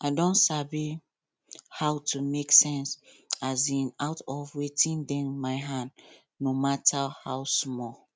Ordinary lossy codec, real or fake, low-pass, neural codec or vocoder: none; real; none; none